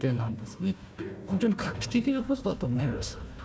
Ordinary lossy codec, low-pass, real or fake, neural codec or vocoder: none; none; fake; codec, 16 kHz, 1 kbps, FunCodec, trained on Chinese and English, 50 frames a second